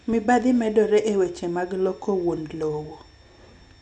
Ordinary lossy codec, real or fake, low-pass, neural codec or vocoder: none; real; 9.9 kHz; none